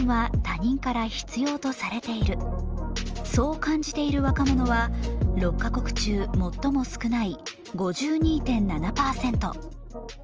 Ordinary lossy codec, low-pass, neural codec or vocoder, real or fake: Opus, 24 kbps; 7.2 kHz; none; real